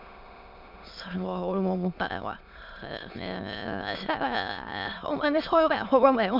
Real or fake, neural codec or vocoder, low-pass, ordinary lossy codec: fake; autoencoder, 22.05 kHz, a latent of 192 numbers a frame, VITS, trained on many speakers; 5.4 kHz; MP3, 48 kbps